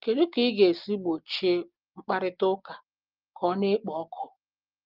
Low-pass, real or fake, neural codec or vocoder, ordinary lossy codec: 5.4 kHz; real; none; Opus, 32 kbps